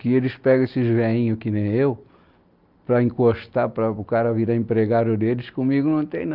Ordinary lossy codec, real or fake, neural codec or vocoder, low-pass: Opus, 24 kbps; real; none; 5.4 kHz